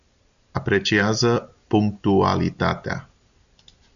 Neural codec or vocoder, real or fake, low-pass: none; real; 7.2 kHz